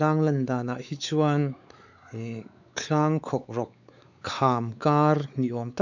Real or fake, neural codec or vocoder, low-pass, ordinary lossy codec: fake; codec, 16 kHz, 4 kbps, X-Codec, WavLM features, trained on Multilingual LibriSpeech; 7.2 kHz; none